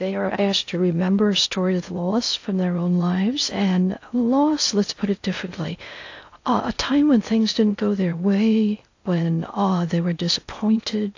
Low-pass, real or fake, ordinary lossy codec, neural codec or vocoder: 7.2 kHz; fake; AAC, 48 kbps; codec, 16 kHz in and 24 kHz out, 0.6 kbps, FocalCodec, streaming, 2048 codes